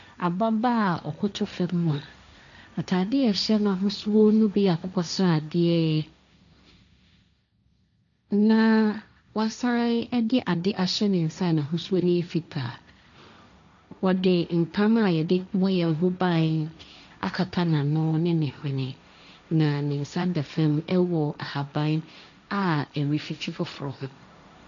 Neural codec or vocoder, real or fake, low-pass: codec, 16 kHz, 1.1 kbps, Voila-Tokenizer; fake; 7.2 kHz